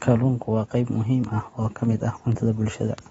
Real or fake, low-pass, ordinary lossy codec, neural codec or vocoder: real; 19.8 kHz; AAC, 24 kbps; none